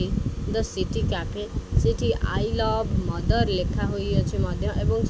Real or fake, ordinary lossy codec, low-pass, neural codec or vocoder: real; none; none; none